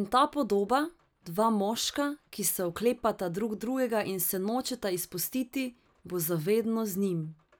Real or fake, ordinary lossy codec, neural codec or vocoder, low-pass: real; none; none; none